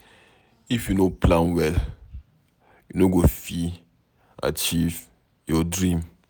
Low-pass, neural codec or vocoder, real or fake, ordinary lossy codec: none; none; real; none